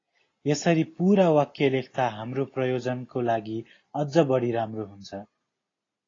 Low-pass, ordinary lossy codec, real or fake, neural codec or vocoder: 7.2 kHz; AAC, 32 kbps; real; none